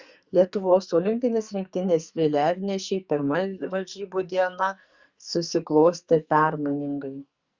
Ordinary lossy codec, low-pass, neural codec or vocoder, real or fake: Opus, 64 kbps; 7.2 kHz; codec, 44.1 kHz, 2.6 kbps, SNAC; fake